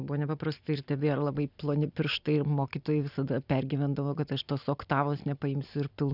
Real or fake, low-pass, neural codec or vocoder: real; 5.4 kHz; none